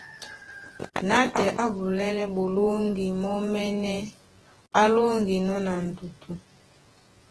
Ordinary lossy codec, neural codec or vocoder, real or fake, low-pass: Opus, 16 kbps; vocoder, 48 kHz, 128 mel bands, Vocos; fake; 10.8 kHz